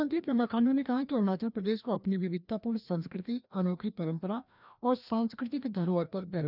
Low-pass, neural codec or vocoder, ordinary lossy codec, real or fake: 5.4 kHz; codec, 16 kHz, 1 kbps, FreqCodec, larger model; none; fake